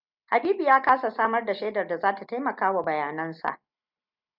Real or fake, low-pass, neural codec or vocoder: real; 5.4 kHz; none